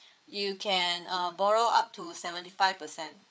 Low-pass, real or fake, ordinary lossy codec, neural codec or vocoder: none; fake; none; codec, 16 kHz, 4 kbps, FreqCodec, larger model